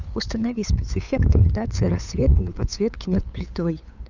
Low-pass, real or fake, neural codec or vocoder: 7.2 kHz; fake; codec, 16 kHz, 8 kbps, FunCodec, trained on LibriTTS, 25 frames a second